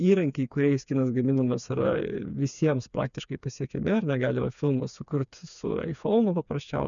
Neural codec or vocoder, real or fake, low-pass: codec, 16 kHz, 4 kbps, FreqCodec, smaller model; fake; 7.2 kHz